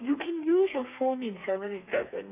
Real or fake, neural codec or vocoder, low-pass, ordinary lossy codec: fake; codec, 32 kHz, 1.9 kbps, SNAC; 3.6 kHz; none